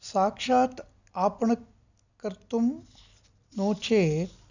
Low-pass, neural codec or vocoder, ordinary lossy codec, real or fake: 7.2 kHz; none; none; real